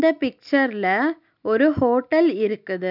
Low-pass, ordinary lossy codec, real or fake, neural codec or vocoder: 5.4 kHz; none; real; none